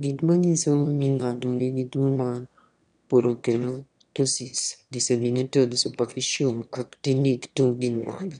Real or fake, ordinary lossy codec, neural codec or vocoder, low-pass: fake; none; autoencoder, 22.05 kHz, a latent of 192 numbers a frame, VITS, trained on one speaker; 9.9 kHz